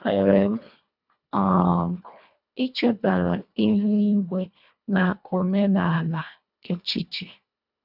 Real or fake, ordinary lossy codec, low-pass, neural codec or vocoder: fake; none; 5.4 kHz; codec, 24 kHz, 1.5 kbps, HILCodec